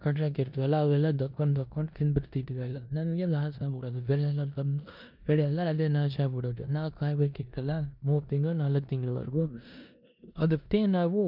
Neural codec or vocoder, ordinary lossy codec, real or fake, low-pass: codec, 16 kHz in and 24 kHz out, 0.9 kbps, LongCat-Audio-Codec, four codebook decoder; MP3, 48 kbps; fake; 5.4 kHz